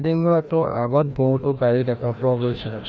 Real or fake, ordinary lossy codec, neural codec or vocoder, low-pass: fake; none; codec, 16 kHz, 1 kbps, FreqCodec, larger model; none